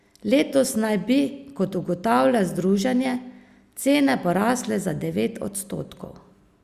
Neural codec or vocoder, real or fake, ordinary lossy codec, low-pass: none; real; Opus, 64 kbps; 14.4 kHz